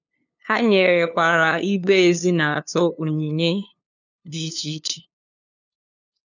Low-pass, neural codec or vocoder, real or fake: 7.2 kHz; codec, 16 kHz, 2 kbps, FunCodec, trained on LibriTTS, 25 frames a second; fake